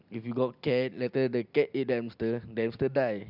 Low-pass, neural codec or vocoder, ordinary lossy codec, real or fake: 5.4 kHz; none; none; real